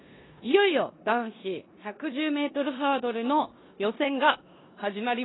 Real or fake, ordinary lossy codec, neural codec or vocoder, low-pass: fake; AAC, 16 kbps; codec, 16 kHz in and 24 kHz out, 0.9 kbps, LongCat-Audio-Codec, four codebook decoder; 7.2 kHz